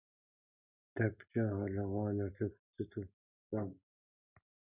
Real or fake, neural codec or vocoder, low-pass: real; none; 3.6 kHz